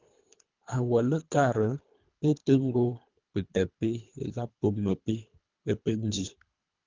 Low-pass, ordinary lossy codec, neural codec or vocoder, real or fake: 7.2 kHz; Opus, 24 kbps; codec, 24 kHz, 3 kbps, HILCodec; fake